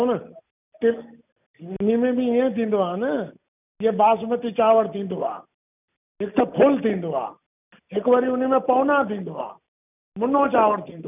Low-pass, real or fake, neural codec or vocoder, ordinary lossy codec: 3.6 kHz; real; none; none